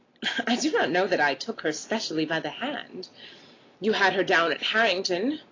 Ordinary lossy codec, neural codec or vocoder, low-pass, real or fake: AAC, 32 kbps; none; 7.2 kHz; real